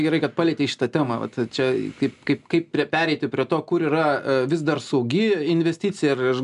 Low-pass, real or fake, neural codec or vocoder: 10.8 kHz; real; none